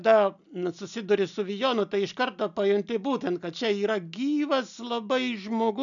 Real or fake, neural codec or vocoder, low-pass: real; none; 7.2 kHz